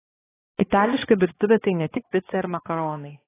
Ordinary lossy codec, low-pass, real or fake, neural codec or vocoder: AAC, 16 kbps; 3.6 kHz; fake; codec, 16 kHz, 2 kbps, X-Codec, HuBERT features, trained on balanced general audio